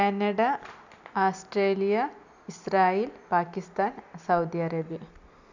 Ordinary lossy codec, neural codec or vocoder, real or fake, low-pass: none; none; real; 7.2 kHz